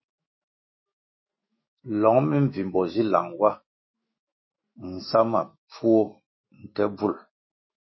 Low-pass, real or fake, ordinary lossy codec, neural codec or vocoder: 7.2 kHz; fake; MP3, 24 kbps; autoencoder, 48 kHz, 128 numbers a frame, DAC-VAE, trained on Japanese speech